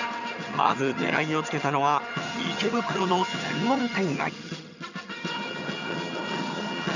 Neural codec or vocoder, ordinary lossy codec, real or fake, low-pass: vocoder, 22.05 kHz, 80 mel bands, HiFi-GAN; none; fake; 7.2 kHz